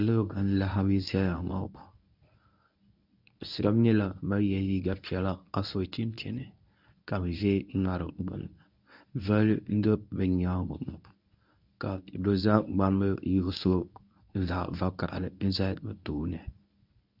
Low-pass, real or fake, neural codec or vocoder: 5.4 kHz; fake; codec, 24 kHz, 0.9 kbps, WavTokenizer, medium speech release version 1